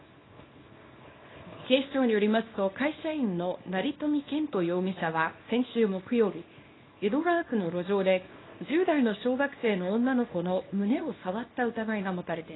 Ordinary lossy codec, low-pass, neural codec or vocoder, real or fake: AAC, 16 kbps; 7.2 kHz; codec, 24 kHz, 0.9 kbps, WavTokenizer, small release; fake